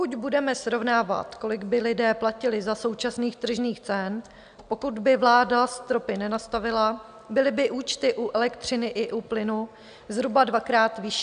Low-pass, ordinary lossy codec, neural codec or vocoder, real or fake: 9.9 kHz; AAC, 96 kbps; none; real